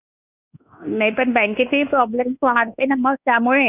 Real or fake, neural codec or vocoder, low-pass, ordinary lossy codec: fake; codec, 24 kHz, 1.2 kbps, DualCodec; 3.6 kHz; none